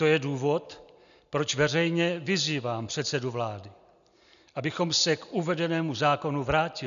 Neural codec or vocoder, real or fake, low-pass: none; real; 7.2 kHz